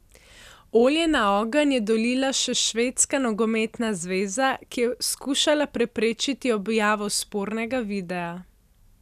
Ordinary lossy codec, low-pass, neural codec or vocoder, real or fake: none; 14.4 kHz; none; real